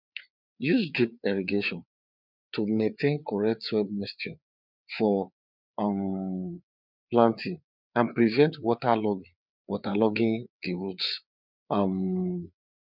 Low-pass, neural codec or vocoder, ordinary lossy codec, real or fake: 5.4 kHz; codec, 16 kHz, 4 kbps, FreqCodec, larger model; none; fake